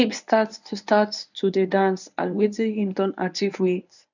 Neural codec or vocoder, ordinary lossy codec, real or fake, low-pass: codec, 24 kHz, 0.9 kbps, WavTokenizer, medium speech release version 1; none; fake; 7.2 kHz